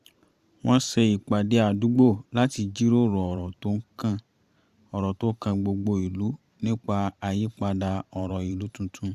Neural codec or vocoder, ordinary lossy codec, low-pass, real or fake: vocoder, 44.1 kHz, 128 mel bands every 512 samples, BigVGAN v2; Opus, 64 kbps; 14.4 kHz; fake